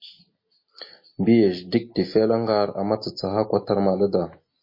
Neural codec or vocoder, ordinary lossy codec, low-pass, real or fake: none; MP3, 24 kbps; 5.4 kHz; real